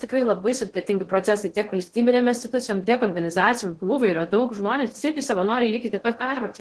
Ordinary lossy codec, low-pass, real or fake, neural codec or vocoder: Opus, 16 kbps; 10.8 kHz; fake; codec, 16 kHz in and 24 kHz out, 0.6 kbps, FocalCodec, streaming, 2048 codes